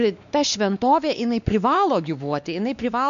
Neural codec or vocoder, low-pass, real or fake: codec, 16 kHz, 2 kbps, X-Codec, WavLM features, trained on Multilingual LibriSpeech; 7.2 kHz; fake